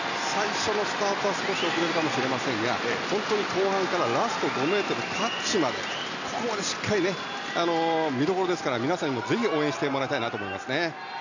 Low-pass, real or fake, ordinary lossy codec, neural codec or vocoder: 7.2 kHz; real; none; none